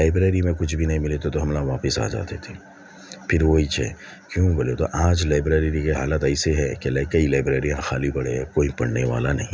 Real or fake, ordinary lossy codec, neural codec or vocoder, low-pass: real; none; none; none